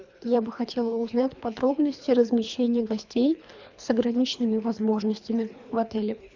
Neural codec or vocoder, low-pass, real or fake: codec, 24 kHz, 3 kbps, HILCodec; 7.2 kHz; fake